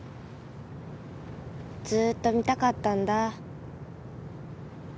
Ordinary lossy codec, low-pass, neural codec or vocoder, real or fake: none; none; none; real